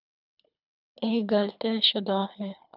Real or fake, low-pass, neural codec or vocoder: fake; 5.4 kHz; codec, 24 kHz, 3 kbps, HILCodec